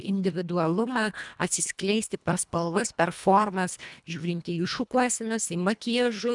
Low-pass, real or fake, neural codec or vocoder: 10.8 kHz; fake; codec, 24 kHz, 1.5 kbps, HILCodec